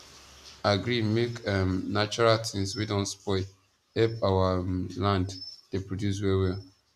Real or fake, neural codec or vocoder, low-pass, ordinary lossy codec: real; none; 14.4 kHz; none